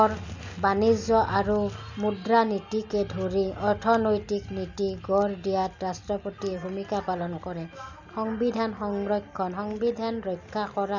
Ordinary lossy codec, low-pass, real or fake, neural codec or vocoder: none; 7.2 kHz; real; none